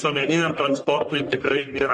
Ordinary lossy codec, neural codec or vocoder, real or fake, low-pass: MP3, 48 kbps; codec, 44.1 kHz, 1.7 kbps, Pupu-Codec; fake; 10.8 kHz